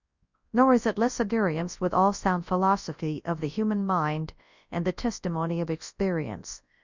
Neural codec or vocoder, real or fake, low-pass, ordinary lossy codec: codec, 24 kHz, 0.9 kbps, WavTokenizer, large speech release; fake; 7.2 kHz; Opus, 64 kbps